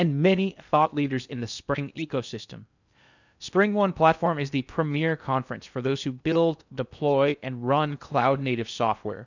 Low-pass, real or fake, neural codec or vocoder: 7.2 kHz; fake; codec, 16 kHz in and 24 kHz out, 0.6 kbps, FocalCodec, streaming, 2048 codes